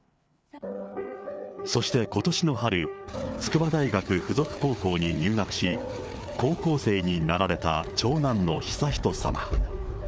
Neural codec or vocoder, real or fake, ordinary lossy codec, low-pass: codec, 16 kHz, 4 kbps, FreqCodec, larger model; fake; none; none